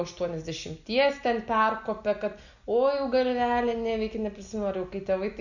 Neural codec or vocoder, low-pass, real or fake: none; 7.2 kHz; real